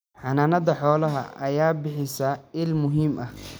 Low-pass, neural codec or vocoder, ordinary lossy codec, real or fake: none; none; none; real